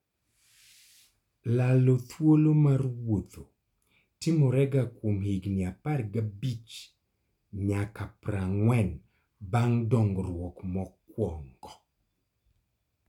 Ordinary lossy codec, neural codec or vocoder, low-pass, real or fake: none; none; 19.8 kHz; real